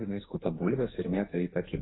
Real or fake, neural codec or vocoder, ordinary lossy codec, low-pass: fake; codec, 44.1 kHz, 2.6 kbps, SNAC; AAC, 16 kbps; 7.2 kHz